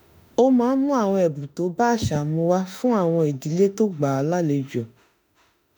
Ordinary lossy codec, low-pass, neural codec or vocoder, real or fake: none; none; autoencoder, 48 kHz, 32 numbers a frame, DAC-VAE, trained on Japanese speech; fake